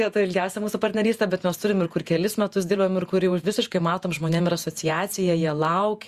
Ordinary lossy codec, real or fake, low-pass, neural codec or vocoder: Opus, 64 kbps; fake; 14.4 kHz; vocoder, 48 kHz, 128 mel bands, Vocos